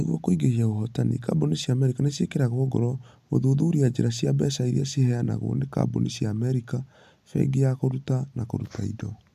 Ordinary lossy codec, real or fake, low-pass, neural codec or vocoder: AAC, 96 kbps; real; 14.4 kHz; none